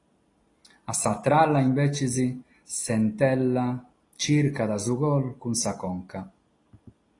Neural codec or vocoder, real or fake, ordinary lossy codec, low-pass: none; real; AAC, 32 kbps; 10.8 kHz